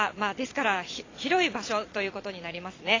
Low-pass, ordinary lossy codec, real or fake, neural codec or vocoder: 7.2 kHz; AAC, 32 kbps; fake; vocoder, 22.05 kHz, 80 mel bands, Vocos